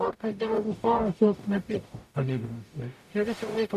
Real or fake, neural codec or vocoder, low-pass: fake; codec, 44.1 kHz, 0.9 kbps, DAC; 14.4 kHz